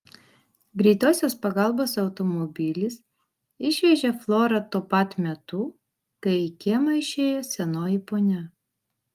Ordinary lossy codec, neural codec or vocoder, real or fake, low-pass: Opus, 32 kbps; none; real; 14.4 kHz